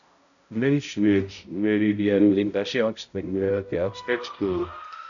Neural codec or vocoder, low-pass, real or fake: codec, 16 kHz, 0.5 kbps, X-Codec, HuBERT features, trained on balanced general audio; 7.2 kHz; fake